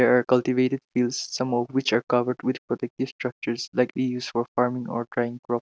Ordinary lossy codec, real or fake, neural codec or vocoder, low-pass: none; real; none; none